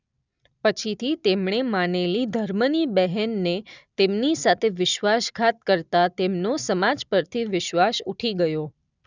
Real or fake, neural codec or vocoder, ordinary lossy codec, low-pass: real; none; none; 7.2 kHz